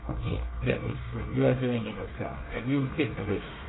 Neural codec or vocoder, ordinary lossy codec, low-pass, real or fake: codec, 24 kHz, 1 kbps, SNAC; AAC, 16 kbps; 7.2 kHz; fake